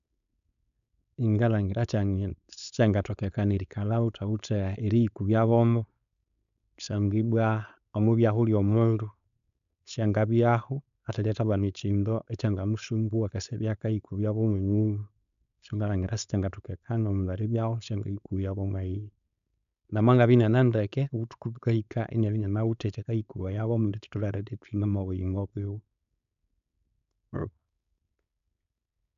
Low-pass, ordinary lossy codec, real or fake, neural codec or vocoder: 7.2 kHz; none; fake; codec, 16 kHz, 4.8 kbps, FACodec